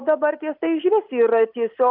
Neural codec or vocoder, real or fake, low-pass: none; real; 5.4 kHz